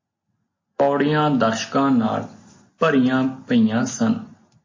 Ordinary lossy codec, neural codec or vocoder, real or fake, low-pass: MP3, 32 kbps; none; real; 7.2 kHz